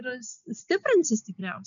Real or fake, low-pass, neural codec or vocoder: real; 7.2 kHz; none